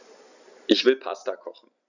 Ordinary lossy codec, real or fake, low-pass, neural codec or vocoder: none; real; 7.2 kHz; none